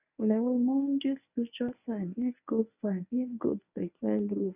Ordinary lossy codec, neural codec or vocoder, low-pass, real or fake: none; codec, 24 kHz, 0.9 kbps, WavTokenizer, medium speech release version 1; 3.6 kHz; fake